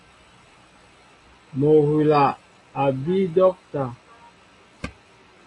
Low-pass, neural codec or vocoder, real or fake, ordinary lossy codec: 10.8 kHz; none; real; AAC, 32 kbps